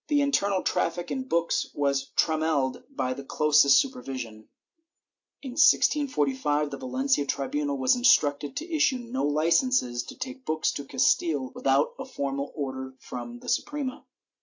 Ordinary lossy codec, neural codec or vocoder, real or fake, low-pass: AAC, 48 kbps; none; real; 7.2 kHz